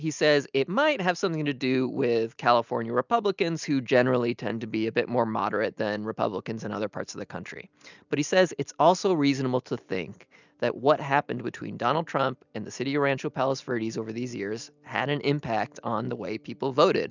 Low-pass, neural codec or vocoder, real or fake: 7.2 kHz; none; real